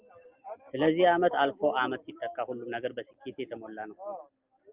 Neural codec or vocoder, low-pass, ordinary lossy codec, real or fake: none; 3.6 kHz; Opus, 24 kbps; real